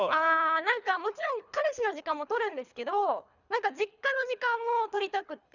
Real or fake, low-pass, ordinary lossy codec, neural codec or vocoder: fake; 7.2 kHz; none; codec, 24 kHz, 3 kbps, HILCodec